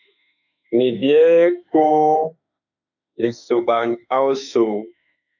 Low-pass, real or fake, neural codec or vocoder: 7.2 kHz; fake; autoencoder, 48 kHz, 32 numbers a frame, DAC-VAE, trained on Japanese speech